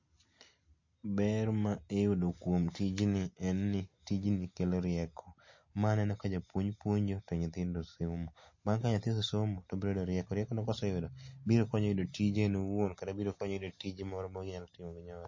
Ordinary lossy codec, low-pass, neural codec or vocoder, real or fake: MP3, 32 kbps; 7.2 kHz; none; real